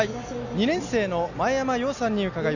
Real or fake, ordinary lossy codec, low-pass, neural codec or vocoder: real; none; 7.2 kHz; none